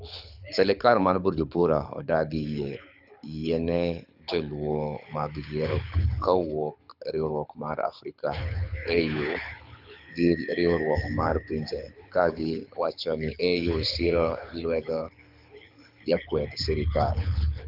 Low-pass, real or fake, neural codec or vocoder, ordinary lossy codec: 5.4 kHz; fake; codec, 24 kHz, 6 kbps, HILCodec; none